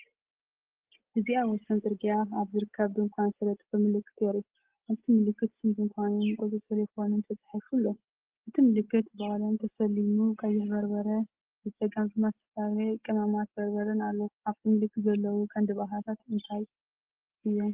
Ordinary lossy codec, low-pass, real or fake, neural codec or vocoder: Opus, 16 kbps; 3.6 kHz; real; none